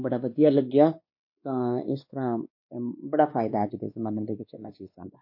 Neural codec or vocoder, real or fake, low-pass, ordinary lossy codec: codec, 16 kHz, 2 kbps, X-Codec, WavLM features, trained on Multilingual LibriSpeech; fake; 5.4 kHz; MP3, 32 kbps